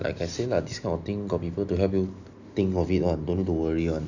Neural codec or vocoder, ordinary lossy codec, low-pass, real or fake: none; none; 7.2 kHz; real